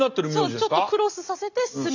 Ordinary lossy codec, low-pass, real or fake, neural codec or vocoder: none; 7.2 kHz; real; none